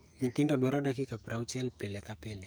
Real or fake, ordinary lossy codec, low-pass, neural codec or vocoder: fake; none; none; codec, 44.1 kHz, 2.6 kbps, SNAC